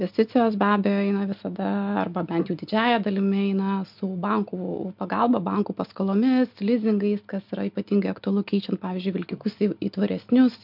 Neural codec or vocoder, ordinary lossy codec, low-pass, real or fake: none; MP3, 48 kbps; 5.4 kHz; real